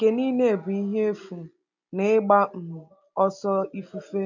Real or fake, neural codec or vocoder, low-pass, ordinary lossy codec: real; none; 7.2 kHz; none